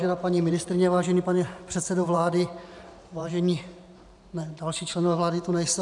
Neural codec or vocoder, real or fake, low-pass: vocoder, 44.1 kHz, 128 mel bands every 512 samples, BigVGAN v2; fake; 10.8 kHz